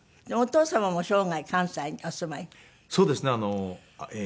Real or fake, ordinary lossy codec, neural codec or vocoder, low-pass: real; none; none; none